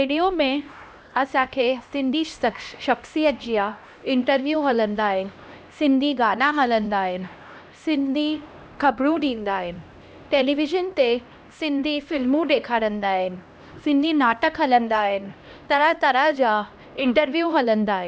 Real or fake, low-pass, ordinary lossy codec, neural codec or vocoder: fake; none; none; codec, 16 kHz, 1 kbps, X-Codec, HuBERT features, trained on LibriSpeech